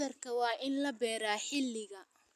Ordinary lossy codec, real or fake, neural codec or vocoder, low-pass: none; real; none; 14.4 kHz